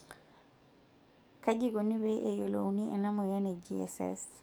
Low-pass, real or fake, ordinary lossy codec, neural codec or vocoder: none; fake; none; codec, 44.1 kHz, 7.8 kbps, DAC